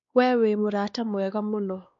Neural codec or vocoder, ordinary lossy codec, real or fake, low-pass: codec, 16 kHz, 2 kbps, X-Codec, WavLM features, trained on Multilingual LibriSpeech; MP3, 48 kbps; fake; 7.2 kHz